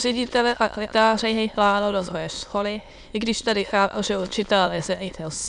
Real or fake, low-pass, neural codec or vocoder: fake; 9.9 kHz; autoencoder, 22.05 kHz, a latent of 192 numbers a frame, VITS, trained on many speakers